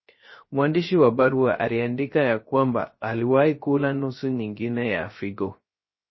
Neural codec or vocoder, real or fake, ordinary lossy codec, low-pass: codec, 16 kHz, 0.3 kbps, FocalCodec; fake; MP3, 24 kbps; 7.2 kHz